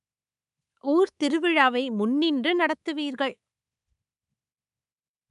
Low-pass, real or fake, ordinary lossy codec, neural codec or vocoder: 10.8 kHz; fake; none; codec, 24 kHz, 3.1 kbps, DualCodec